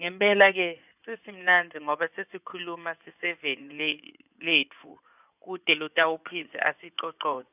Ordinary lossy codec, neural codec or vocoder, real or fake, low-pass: none; codec, 16 kHz in and 24 kHz out, 2.2 kbps, FireRedTTS-2 codec; fake; 3.6 kHz